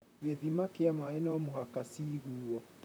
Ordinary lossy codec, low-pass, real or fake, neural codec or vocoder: none; none; fake; vocoder, 44.1 kHz, 128 mel bands, Pupu-Vocoder